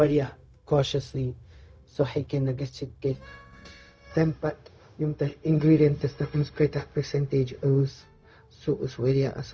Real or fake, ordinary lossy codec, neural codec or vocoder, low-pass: fake; none; codec, 16 kHz, 0.4 kbps, LongCat-Audio-Codec; none